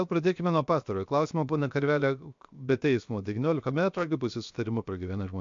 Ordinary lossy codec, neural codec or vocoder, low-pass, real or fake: MP3, 64 kbps; codec, 16 kHz, 0.7 kbps, FocalCodec; 7.2 kHz; fake